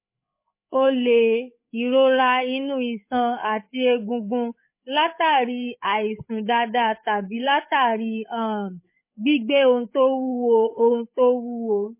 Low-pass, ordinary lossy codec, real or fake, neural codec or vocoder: 3.6 kHz; MP3, 24 kbps; fake; codec, 16 kHz, 8 kbps, FreqCodec, larger model